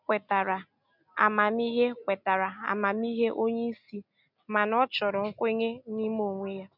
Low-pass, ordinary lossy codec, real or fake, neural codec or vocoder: 5.4 kHz; none; real; none